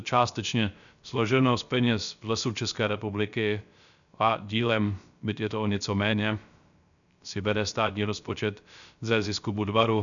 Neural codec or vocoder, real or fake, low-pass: codec, 16 kHz, 0.3 kbps, FocalCodec; fake; 7.2 kHz